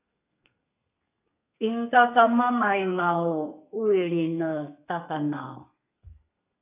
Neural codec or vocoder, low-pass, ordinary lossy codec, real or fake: codec, 32 kHz, 1.9 kbps, SNAC; 3.6 kHz; AAC, 32 kbps; fake